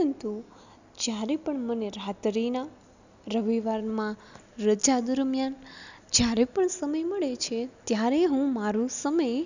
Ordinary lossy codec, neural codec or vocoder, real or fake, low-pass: none; none; real; 7.2 kHz